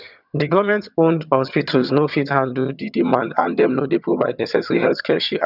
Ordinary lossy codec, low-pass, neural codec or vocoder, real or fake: none; 5.4 kHz; vocoder, 22.05 kHz, 80 mel bands, HiFi-GAN; fake